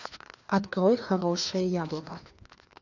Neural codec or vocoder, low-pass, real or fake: codec, 16 kHz, 2 kbps, FreqCodec, larger model; 7.2 kHz; fake